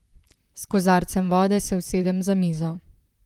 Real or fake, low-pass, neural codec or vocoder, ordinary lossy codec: fake; 19.8 kHz; vocoder, 44.1 kHz, 128 mel bands, Pupu-Vocoder; Opus, 32 kbps